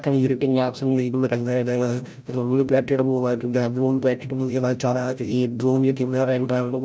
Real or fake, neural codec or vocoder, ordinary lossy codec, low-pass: fake; codec, 16 kHz, 0.5 kbps, FreqCodec, larger model; none; none